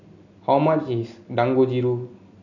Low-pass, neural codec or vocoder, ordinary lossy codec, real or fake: 7.2 kHz; none; none; real